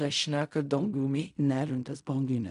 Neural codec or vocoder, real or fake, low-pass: codec, 16 kHz in and 24 kHz out, 0.4 kbps, LongCat-Audio-Codec, fine tuned four codebook decoder; fake; 10.8 kHz